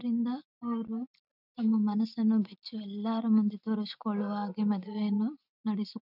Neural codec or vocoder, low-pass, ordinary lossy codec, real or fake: none; 5.4 kHz; none; real